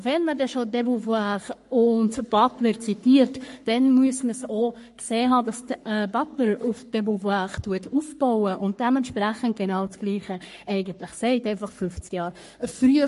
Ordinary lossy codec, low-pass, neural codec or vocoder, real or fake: MP3, 48 kbps; 14.4 kHz; codec, 44.1 kHz, 3.4 kbps, Pupu-Codec; fake